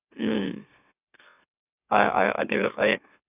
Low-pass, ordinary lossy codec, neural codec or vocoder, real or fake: 3.6 kHz; none; autoencoder, 44.1 kHz, a latent of 192 numbers a frame, MeloTTS; fake